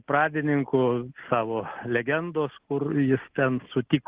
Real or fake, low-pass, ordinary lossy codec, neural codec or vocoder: real; 3.6 kHz; Opus, 16 kbps; none